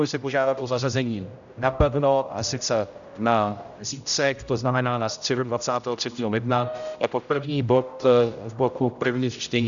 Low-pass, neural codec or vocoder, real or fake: 7.2 kHz; codec, 16 kHz, 0.5 kbps, X-Codec, HuBERT features, trained on general audio; fake